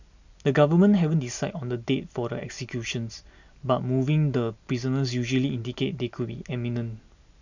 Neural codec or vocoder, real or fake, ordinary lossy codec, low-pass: none; real; none; 7.2 kHz